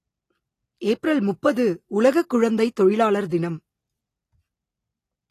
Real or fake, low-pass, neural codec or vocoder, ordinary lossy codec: real; 14.4 kHz; none; AAC, 48 kbps